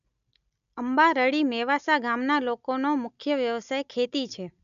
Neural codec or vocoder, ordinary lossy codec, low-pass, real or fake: none; none; 7.2 kHz; real